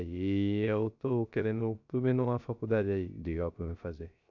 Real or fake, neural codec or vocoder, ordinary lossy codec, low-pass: fake; codec, 16 kHz, 0.3 kbps, FocalCodec; none; 7.2 kHz